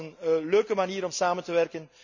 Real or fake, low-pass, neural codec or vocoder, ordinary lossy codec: real; 7.2 kHz; none; none